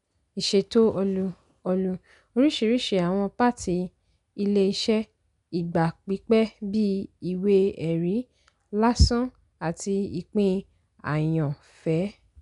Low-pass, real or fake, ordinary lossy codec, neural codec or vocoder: 10.8 kHz; real; none; none